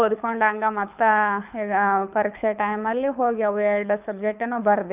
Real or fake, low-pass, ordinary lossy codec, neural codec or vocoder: fake; 3.6 kHz; none; codec, 24 kHz, 6 kbps, HILCodec